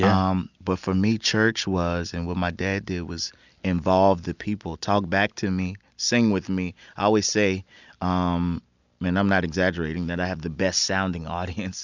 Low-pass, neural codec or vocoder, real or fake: 7.2 kHz; none; real